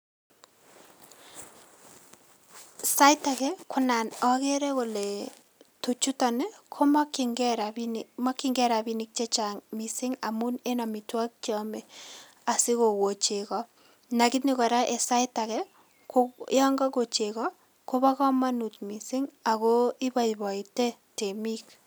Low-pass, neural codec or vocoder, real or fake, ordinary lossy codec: none; none; real; none